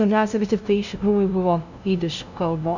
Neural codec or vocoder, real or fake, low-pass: codec, 16 kHz, 0.5 kbps, FunCodec, trained on LibriTTS, 25 frames a second; fake; 7.2 kHz